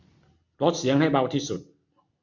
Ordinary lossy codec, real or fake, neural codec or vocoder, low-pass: MP3, 64 kbps; real; none; 7.2 kHz